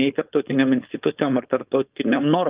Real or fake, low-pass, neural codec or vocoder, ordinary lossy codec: fake; 3.6 kHz; codec, 16 kHz, 4.8 kbps, FACodec; Opus, 24 kbps